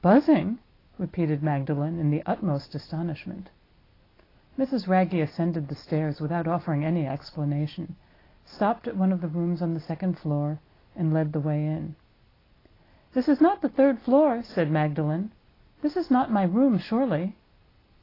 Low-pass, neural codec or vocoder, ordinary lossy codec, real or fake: 5.4 kHz; none; AAC, 24 kbps; real